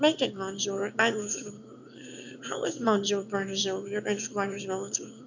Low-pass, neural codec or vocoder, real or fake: 7.2 kHz; autoencoder, 22.05 kHz, a latent of 192 numbers a frame, VITS, trained on one speaker; fake